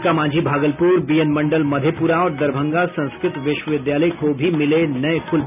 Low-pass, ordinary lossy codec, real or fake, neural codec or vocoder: 3.6 kHz; none; real; none